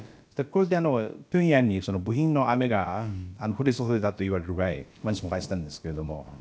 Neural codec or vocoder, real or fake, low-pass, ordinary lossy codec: codec, 16 kHz, about 1 kbps, DyCAST, with the encoder's durations; fake; none; none